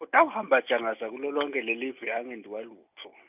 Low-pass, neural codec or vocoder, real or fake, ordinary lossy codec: 3.6 kHz; none; real; none